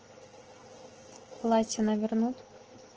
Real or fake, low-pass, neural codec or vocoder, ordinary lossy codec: real; 7.2 kHz; none; Opus, 16 kbps